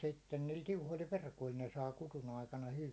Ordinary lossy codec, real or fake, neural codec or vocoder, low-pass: none; real; none; none